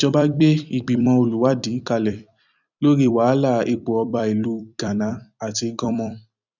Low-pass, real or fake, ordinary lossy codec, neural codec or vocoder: 7.2 kHz; fake; none; vocoder, 44.1 kHz, 128 mel bands every 256 samples, BigVGAN v2